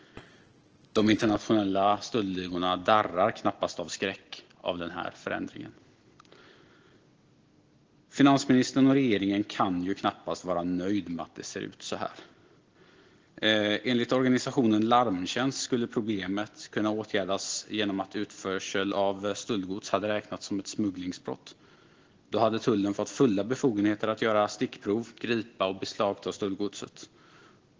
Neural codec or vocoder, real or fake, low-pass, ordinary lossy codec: none; real; 7.2 kHz; Opus, 16 kbps